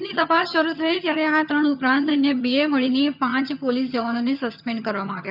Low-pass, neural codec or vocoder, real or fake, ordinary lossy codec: 5.4 kHz; vocoder, 22.05 kHz, 80 mel bands, HiFi-GAN; fake; none